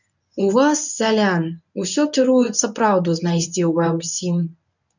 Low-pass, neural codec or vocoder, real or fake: 7.2 kHz; codec, 24 kHz, 0.9 kbps, WavTokenizer, medium speech release version 1; fake